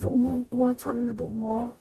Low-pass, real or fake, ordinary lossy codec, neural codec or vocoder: 14.4 kHz; fake; MP3, 64 kbps; codec, 44.1 kHz, 0.9 kbps, DAC